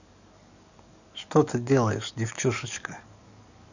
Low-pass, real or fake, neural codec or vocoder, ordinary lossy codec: 7.2 kHz; fake; codec, 44.1 kHz, 7.8 kbps, DAC; none